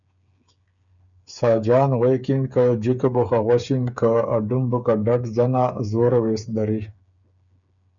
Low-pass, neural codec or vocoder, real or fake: 7.2 kHz; codec, 16 kHz, 8 kbps, FreqCodec, smaller model; fake